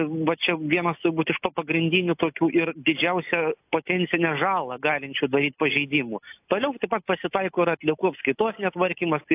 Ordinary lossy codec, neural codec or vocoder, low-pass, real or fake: AAC, 32 kbps; none; 3.6 kHz; real